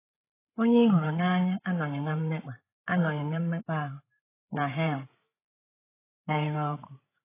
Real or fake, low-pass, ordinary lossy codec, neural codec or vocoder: fake; 3.6 kHz; AAC, 16 kbps; codec, 16 kHz, 8 kbps, FreqCodec, larger model